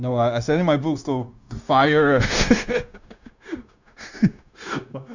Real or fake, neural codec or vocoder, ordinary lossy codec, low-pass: fake; codec, 16 kHz, 0.9 kbps, LongCat-Audio-Codec; none; 7.2 kHz